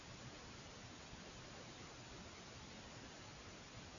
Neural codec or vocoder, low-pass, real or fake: none; 7.2 kHz; real